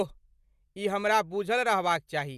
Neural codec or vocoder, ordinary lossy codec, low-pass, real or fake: none; Opus, 64 kbps; 14.4 kHz; real